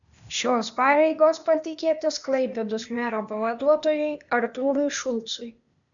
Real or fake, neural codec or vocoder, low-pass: fake; codec, 16 kHz, 0.8 kbps, ZipCodec; 7.2 kHz